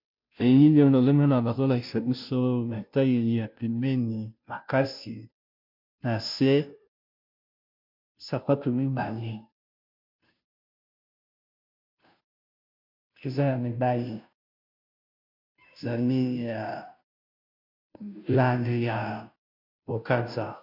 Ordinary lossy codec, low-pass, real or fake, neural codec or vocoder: MP3, 48 kbps; 5.4 kHz; fake; codec, 16 kHz, 0.5 kbps, FunCodec, trained on Chinese and English, 25 frames a second